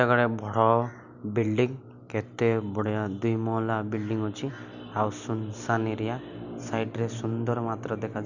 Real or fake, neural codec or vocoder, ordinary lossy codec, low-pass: real; none; Opus, 64 kbps; 7.2 kHz